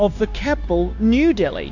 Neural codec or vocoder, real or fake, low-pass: codec, 16 kHz, 0.9 kbps, LongCat-Audio-Codec; fake; 7.2 kHz